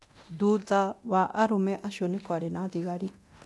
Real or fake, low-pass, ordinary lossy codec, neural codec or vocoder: fake; none; none; codec, 24 kHz, 0.9 kbps, DualCodec